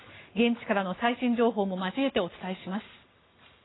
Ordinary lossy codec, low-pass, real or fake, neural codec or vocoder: AAC, 16 kbps; 7.2 kHz; real; none